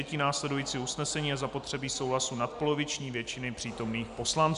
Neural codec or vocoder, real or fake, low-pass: none; real; 10.8 kHz